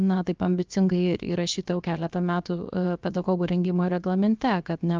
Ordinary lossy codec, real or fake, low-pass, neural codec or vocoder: Opus, 24 kbps; fake; 7.2 kHz; codec, 16 kHz, 0.7 kbps, FocalCodec